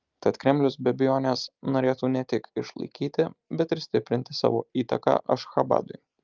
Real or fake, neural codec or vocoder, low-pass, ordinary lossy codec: real; none; 7.2 kHz; Opus, 24 kbps